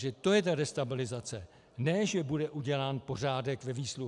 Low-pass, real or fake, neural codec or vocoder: 10.8 kHz; real; none